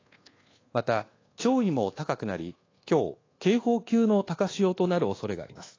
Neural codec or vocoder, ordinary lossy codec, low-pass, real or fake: codec, 24 kHz, 1.2 kbps, DualCodec; AAC, 32 kbps; 7.2 kHz; fake